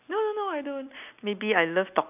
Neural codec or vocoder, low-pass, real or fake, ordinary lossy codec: none; 3.6 kHz; real; none